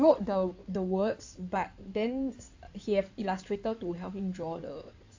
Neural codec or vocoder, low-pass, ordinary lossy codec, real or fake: codec, 16 kHz in and 24 kHz out, 2.2 kbps, FireRedTTS-2 codec; 7.2 kHz; AAC, 48 kbps; fake